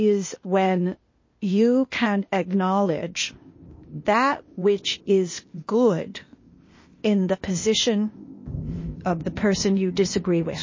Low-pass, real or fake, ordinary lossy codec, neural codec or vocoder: 7.2 kHz; fake; MP3, 32 kbps; codec, 16 kHz, 0.8 kbps, ZipCodec